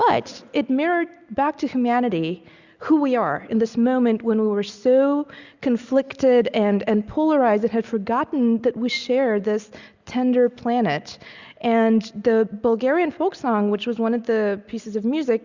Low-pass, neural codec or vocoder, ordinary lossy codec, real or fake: 7.2 kHz; none; Opus, 64 kbps; real